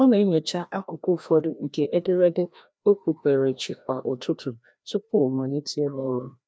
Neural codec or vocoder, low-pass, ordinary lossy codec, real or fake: codec, 16 kHz, 1 kbps, FreqCodec, larger model; none; none; fake